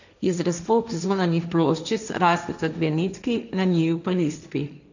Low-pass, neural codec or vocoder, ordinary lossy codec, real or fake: 7.2 kHz; codec, 16 kHz, 1.1 kbps, Voila-Tokenizer; none; fake